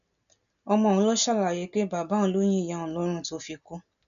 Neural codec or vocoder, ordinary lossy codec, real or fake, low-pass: none; none; real; 7.2 kHz